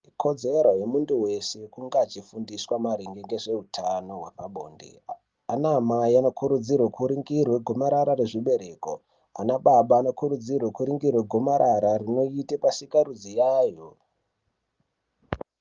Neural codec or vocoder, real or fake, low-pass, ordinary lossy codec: none; real; 7.2 kHz; Opus, 32 kbps